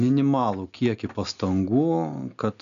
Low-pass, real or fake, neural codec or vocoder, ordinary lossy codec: 7.2 kHz; real; none; MP3, 96 kbps